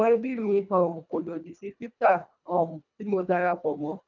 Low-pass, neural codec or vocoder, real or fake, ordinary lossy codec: 7.2 kHz; codec, 24 kHz, 1.5 kbps, HILCodec; fake; none